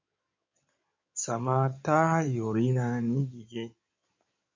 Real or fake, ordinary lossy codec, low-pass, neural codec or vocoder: fake; MP3, 48 kbps; 7.2 kHz; codec, 16 kHz in and 24 kHz out, 2.2 kbps, FireRedTTS-2 codec